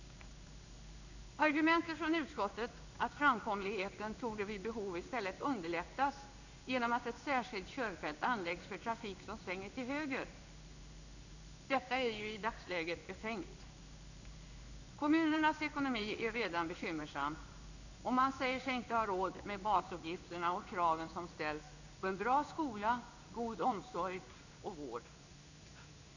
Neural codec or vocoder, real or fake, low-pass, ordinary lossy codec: codec, 16 kHz in and 24 kHz out, 1 kbps, XY-Tokenizer; fake; 7.2 kHz; none